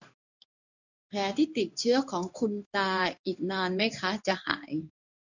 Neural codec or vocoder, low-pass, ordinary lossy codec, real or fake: codec, 16 kHz in and 24 kHz out, 1 kbps, XY-Tokenizer; 7.2 kHz; none; fake